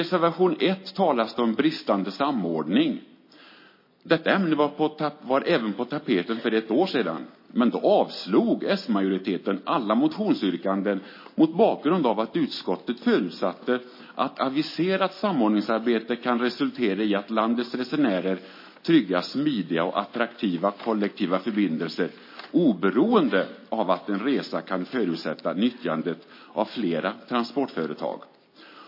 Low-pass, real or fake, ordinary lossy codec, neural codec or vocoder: 5.4 kHz; real; MP3, 24 kbps; none